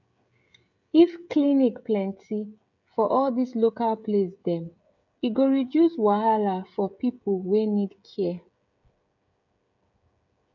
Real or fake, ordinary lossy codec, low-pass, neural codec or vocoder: fake; MP3, 64 kbps; 7.2 kHz; codec, 16 kHz, 16 kbps, FreqCodec, smaller model